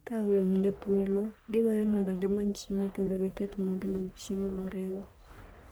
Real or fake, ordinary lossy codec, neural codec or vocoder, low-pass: fake; none; codec, 44.1 kHz, 1.7 kbps, Pupu-Codec; none